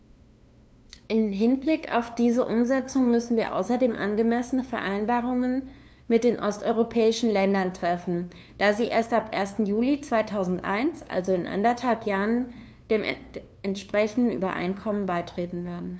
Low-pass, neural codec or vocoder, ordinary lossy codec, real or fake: none; codec, 16 kHz, 2 kbps, FunCodec, trained on LibriTTS, 25 frames a second; none; fake